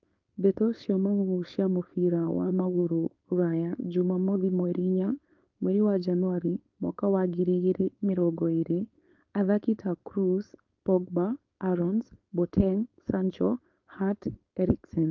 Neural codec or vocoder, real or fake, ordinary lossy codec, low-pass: codec, 16 kHz, 4.8 kbps, FACodec; fake; Opus, 24 kbps; 7.2 kHz